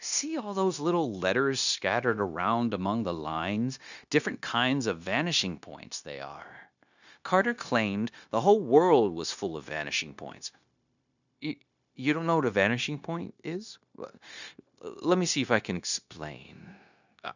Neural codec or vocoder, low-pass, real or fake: codec, 16 kHz, 0.9 kbps, LongCat-Audio-Codec; 7.2 kHz; fake